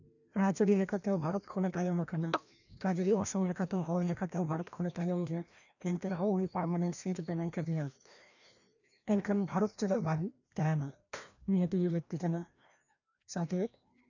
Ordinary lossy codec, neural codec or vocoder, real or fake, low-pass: none; codec, 16 kHz, 1 kbps, FreqCodec, larger model; fake; 7.2 kHz